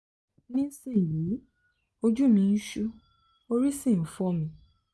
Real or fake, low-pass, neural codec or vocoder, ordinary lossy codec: real; none; none; none